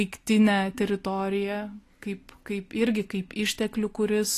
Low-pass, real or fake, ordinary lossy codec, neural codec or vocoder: 14.4 kHz; real; AAC, 48 kbps; none